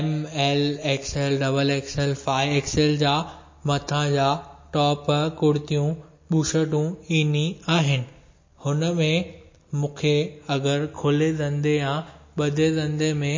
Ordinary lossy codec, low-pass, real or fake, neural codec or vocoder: MP3, 32 kbps; 7.2 kHz; real; none